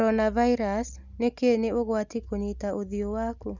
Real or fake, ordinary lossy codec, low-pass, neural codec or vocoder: real; none; 7.2 kHz; none